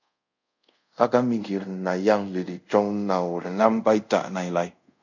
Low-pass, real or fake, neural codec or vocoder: 7.2 kHz; fake; codec, 24 kHz, 0.5 kbps, DualCodec